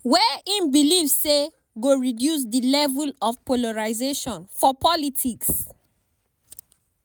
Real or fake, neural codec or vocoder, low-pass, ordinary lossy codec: real; none; none; none